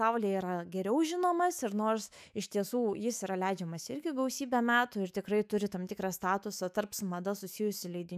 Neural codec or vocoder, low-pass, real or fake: autoencoder, 48 kHz, 128 numbers a frame, DAC-VAE, trained on Japanese speech; 14.4 kHz; fake